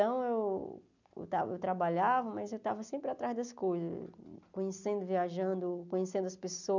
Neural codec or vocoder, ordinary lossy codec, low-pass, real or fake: none; none; 7.2 kHz; real